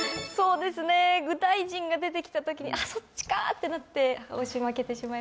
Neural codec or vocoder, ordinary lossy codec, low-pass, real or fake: none; none; none; real